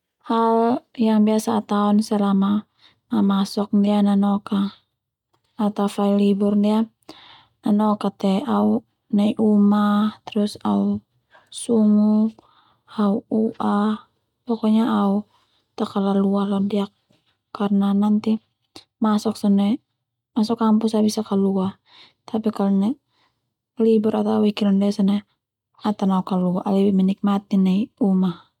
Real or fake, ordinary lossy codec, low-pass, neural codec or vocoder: real; MP3, 96 kbps; 19.8 kHz; none